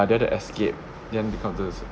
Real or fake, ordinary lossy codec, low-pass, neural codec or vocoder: real; none; none; none